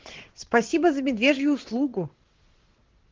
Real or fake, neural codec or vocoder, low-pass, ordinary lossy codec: fake; vocoder, 22.05 kHz, 80 mel bands, WaveNeXt; 7.2 kHz; Opus, 16 kbps